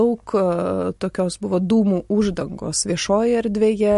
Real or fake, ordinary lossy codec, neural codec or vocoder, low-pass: real; MP3, 48 kbps; none; 14.4 kHz